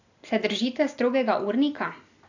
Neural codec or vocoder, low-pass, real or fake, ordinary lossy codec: none; 7.2 kHz; real; AAC, 48 kbps